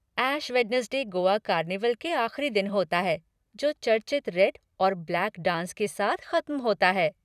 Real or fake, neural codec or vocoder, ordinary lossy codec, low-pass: fake; vocoder, 44.1 kHz, 128 mel bands every 256 samples, BigVGAN v2; none; 14.4 kHz